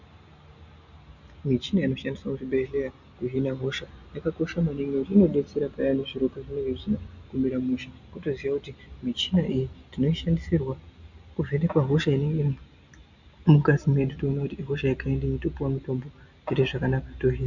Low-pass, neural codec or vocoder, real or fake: 7.2 kHz; none; real